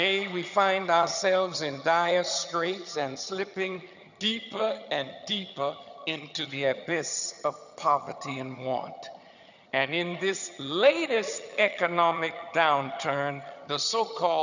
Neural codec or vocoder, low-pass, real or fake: vocoder, 22.05 kHz, 80 mel bands, HiFi-GAN; 7.2 kHz; fake